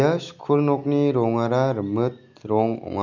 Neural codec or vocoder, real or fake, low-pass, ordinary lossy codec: none; real; 7.2 kHz; none